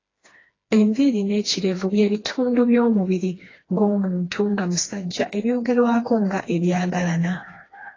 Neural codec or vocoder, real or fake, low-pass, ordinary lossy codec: codec, 16 kHz, 2 kbps, FreqCodec, smaller model; fake; 7.2 kHz; AAC, 32 kbps